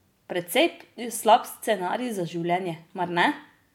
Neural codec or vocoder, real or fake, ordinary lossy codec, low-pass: none; real; MP3, 96 kbps; 19.8 kHz